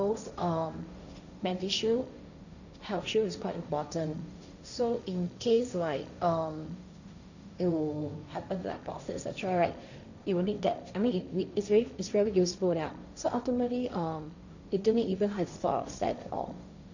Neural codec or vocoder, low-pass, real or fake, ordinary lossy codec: codec, 16 kHz, 1.1 kbps, Voila-Tokenizer; 7.2 kHz; fake; none